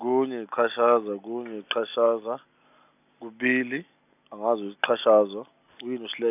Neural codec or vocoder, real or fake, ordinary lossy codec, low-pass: none; real; none; 3.6 kHz